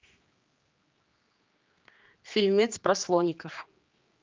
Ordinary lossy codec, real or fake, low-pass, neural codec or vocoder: Opus, 24 kbps; fake; 7.2 kHz; codec, 16 kHz, 2 kbps, X-Codec, HuBERT features, trained on general audio